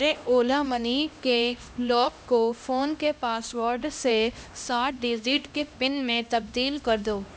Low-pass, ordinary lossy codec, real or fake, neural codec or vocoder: none; none; fake; codec, 16 kHz, 1 kbps, X-Codec, HuBERT features, trained on LibriSpeech